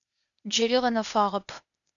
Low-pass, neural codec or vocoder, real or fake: 7.2 kHz; codec, 16 kHz, 0.8 kbps, ZipCodec; fake